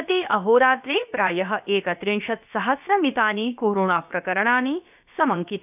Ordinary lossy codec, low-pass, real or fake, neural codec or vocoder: none; 3.6 kHz; fake; codec, 16 kHz, about 1 kbps, DyCAST, with the encoder's durations